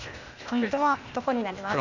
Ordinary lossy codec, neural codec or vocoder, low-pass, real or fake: none; codec, 16 kHz, 0.8 kbps, ZipCodec; 7.2 kHz; fake